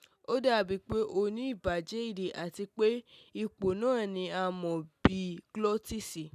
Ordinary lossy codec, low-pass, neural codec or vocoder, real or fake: none; 14.4 kHz; none; real